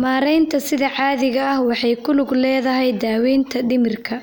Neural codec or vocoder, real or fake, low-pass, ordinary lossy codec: none; real; none; none